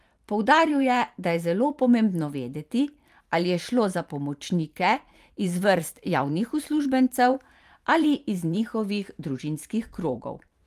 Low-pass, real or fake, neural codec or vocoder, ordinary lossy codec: 14.4 kHz; fake; vocoder, 44.1 kHz, 128 mel bands every 512 samples, BigVGAN v2; Opus, 32 kbps